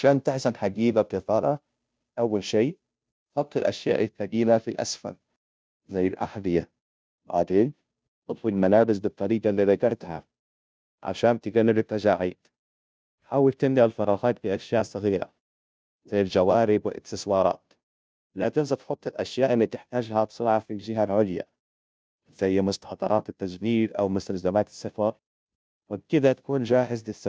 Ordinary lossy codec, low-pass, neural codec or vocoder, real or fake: none; none; codec, 16 kHz, 0.5 kbps, FunCodec, trained on Chinese and English, 25 frames a second; fake